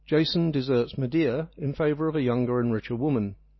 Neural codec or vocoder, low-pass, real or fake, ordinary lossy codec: none; 7.2 kHz; real; MP3, 24 kbps